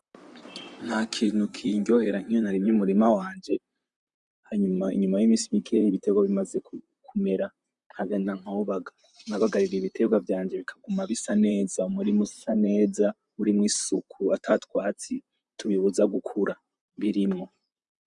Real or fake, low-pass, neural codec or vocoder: fake; 10.8 kHz; vocoder, 44.1 kHz, 128 mel bands, Pupu-Vocoder